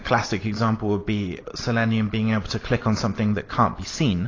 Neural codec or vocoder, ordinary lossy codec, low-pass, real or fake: none; AAC, 32 kbps; 7.2 kHz; real